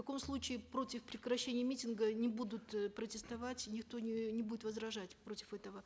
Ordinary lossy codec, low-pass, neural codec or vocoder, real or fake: none; none; none; real